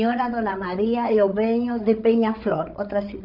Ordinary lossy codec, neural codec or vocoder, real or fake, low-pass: none; codec, 16 kHz, 8 kbps, FreqCodec, larger model; fake; 5.4 kHz